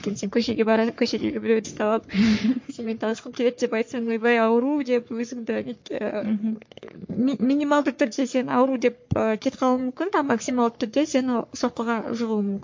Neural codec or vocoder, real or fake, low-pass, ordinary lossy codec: codec, 44.1 kHz, 3.4 kbps, Pupu-Codec; fake; 7.2 kHz; MP3, 48 kbps